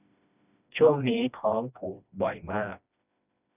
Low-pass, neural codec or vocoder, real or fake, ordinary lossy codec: 3.6 kHz; codec, 16 kHz, 1 kbps, FreqCodec, smaller model; fake; none